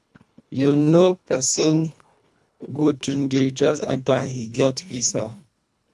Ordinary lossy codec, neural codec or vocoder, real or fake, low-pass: none; codec, 24 kHz, 1.5 kbps, HILCodec; fake; none